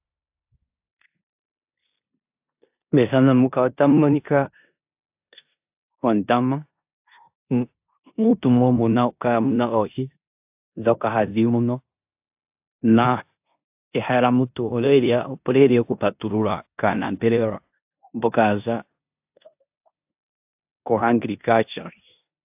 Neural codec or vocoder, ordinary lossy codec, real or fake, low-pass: codec, 16 kHz in and 24 kHz out, 0.9 kbps, LongCat-Audio-Codec, four codebook decoder; AAC, 32 kbps; fake; 3.6 kHz